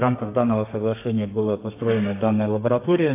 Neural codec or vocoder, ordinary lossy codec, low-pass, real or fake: codec, 32 kHz, 1.9 kbps, SNAC; AAC, 24 kbps; 3.6 kHz; fake